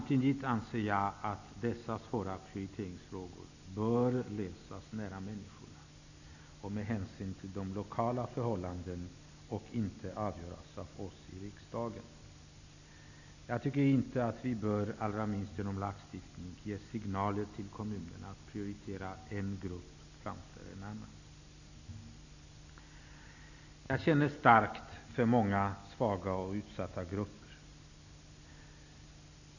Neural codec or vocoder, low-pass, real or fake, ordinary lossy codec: none; 7.2 kHz; real; none